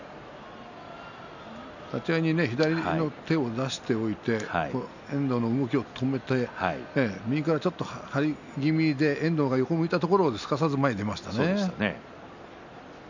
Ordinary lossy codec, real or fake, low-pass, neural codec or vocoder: none; real; 7.2 kHz; none